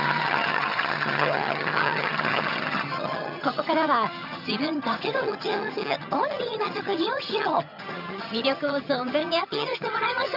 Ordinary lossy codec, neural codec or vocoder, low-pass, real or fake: none; vocoder, 22.05 kHz, 80 mel bands, HiFi-GAN; 5.4 kHz; fake